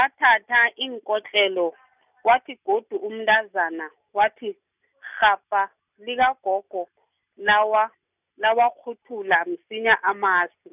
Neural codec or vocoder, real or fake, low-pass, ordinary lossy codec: none; real; 3.6 kHz; none